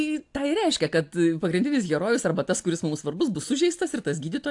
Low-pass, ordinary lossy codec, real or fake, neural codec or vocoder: 10.8 kHz; AAC, 64 kbps; real; none